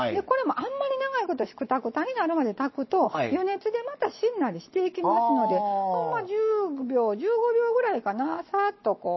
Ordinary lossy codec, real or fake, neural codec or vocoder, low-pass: MP3, 24 kbps; real; none; 7.2 kHz